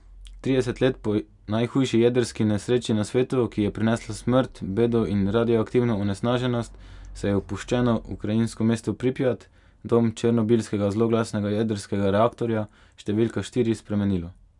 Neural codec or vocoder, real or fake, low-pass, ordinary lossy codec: none; real; 10.8 kHz; none